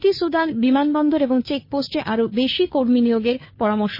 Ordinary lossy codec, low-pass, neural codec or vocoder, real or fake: MP3, 24 kbps; 5.4 kHz; codec, 16 kHz, 2 kbps, FunCodec, trained on Chinese and English, 25 frames a second; fake